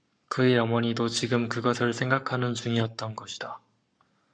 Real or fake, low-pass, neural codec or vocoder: fake; 9.9 kHz; codec, 44.1 kHz, 7.8 kbps, Pupu-Codec